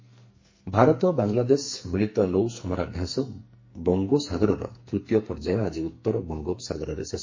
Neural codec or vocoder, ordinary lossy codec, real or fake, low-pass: codec, 44.1 kHz, 2.6 kbps, SNAC; MP3, 32 kbps; fake; 7.2 kHz